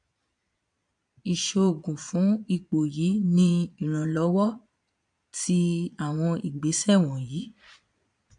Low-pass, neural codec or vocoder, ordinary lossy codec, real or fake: 9.9 kHz; vocoder, 22.05 kHz, 80 mel bands, Vocos; MP3, 64 kbps; fake